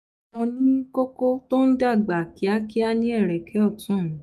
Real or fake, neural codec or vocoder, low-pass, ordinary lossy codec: fake; autoencoder, 48 kHz, 128 numbers a frame, DAC-VAE, trained on Japanese speech; 14.4 kHz; none